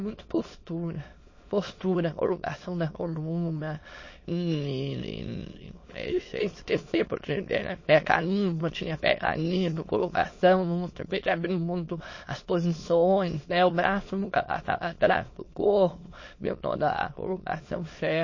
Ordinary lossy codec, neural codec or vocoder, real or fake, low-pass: MP3, 32 kbps; autoencoder, 22.05 kHz, a latent of 192 numbers a frame, VITS, trained on many speakers; fake; 7.2 kHz